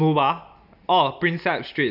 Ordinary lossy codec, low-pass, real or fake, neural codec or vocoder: none; 5.4 kHz; real; none